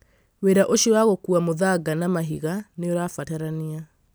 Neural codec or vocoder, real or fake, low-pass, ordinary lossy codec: none; real; none; none